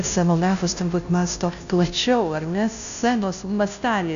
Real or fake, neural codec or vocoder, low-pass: fake; codec, 16 kHz, 0.5 kbps, FunCodec, trained on Chinese and English, 25 frames a second; 7.2 kHz